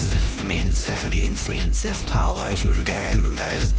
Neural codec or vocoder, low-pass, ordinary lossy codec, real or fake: codec, 16 kHz, 1 kbps, X-Codec, HuBERT features, trained on LibriSpeech; none; none; fake